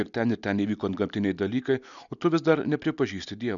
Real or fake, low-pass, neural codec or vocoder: real; 7.2 kHz; none